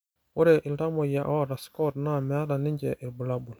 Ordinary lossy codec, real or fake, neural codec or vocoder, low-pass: none; real; none; none